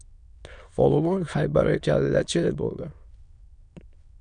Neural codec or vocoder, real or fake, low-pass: autoencoder, 22.05 kHz, a latent of 192 numbers a frame, VITS, trained on many speakers; fake; 9.9 kHz